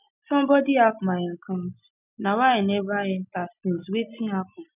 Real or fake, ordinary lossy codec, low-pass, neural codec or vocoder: real; none; 3.6 kHz; none